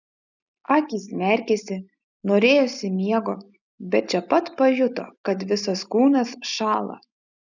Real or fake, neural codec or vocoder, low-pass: real; none; 7.2 kHz